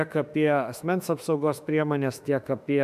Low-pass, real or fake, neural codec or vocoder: 14.4 kHz; fake; autoencoder, 48 kHz, 32 numbers a frame, DAC-VAE, trained on Japanese speech